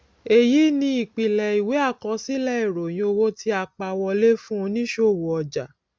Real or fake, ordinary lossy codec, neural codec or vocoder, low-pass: real; none; none; none